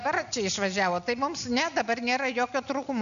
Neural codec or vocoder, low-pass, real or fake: none; 7.2 kHz; real